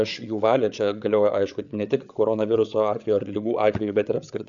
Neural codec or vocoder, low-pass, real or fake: codec, 16 kHz, 8 kbps, FreqCodec, larger model; 7.2 kHz; fake